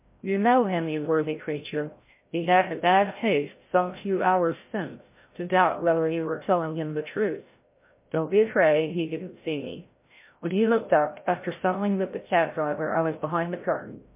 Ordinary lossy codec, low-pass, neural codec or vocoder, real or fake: MP3, 24 kbps; 3.6 kHz; codec, 16 kHz, 0.5 kbps, FreqCodec, larger model; fake